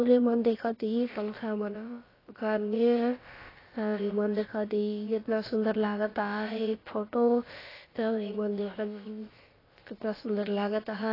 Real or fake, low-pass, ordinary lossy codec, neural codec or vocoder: fake; 5.4 kHz; AAC, 24 kbps; codec, 16 kHz, about 1 kbps, DyCAST, with the encoder's durations